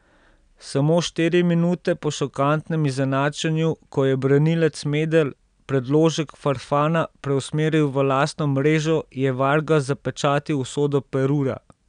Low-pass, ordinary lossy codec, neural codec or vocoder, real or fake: 9.9 kHz; none; none; real